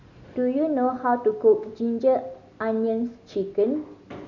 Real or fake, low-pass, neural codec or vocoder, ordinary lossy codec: real; 7.2 kHz; none; none